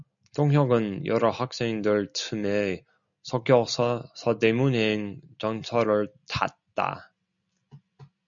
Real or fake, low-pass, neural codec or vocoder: real; 7.2 kHz; none